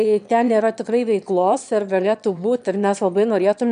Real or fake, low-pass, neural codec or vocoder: fake; 9.9 kHz; autoencoder, 22.05 kHz, a latent of 192 numbers a frame, VITS, trained on one speaker